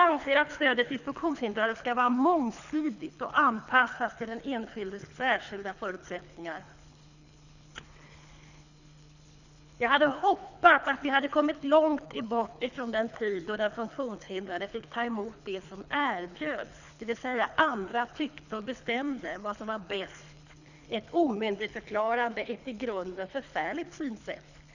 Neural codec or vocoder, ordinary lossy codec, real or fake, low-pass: codec, 24 kHz, 3 kbps, HILCodec; none; fake; 7.2 kHz